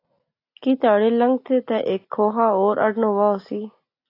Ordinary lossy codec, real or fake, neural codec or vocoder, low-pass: AAC, 32 kbps; real; none; 5.4 kHz